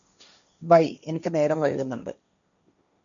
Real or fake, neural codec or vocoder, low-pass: fake; codec, 16 kHz, 1.1 kbps, Voila-Tokenizer; 7.2 kHz